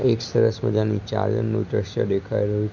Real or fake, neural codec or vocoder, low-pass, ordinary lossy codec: real; none; 7.2 kHz; none